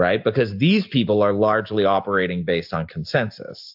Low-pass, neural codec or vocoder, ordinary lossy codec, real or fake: 5.4 kHz; none; AAC, 48 kbps; real